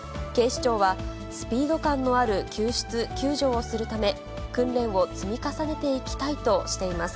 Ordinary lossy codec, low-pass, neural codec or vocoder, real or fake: none; none; none; real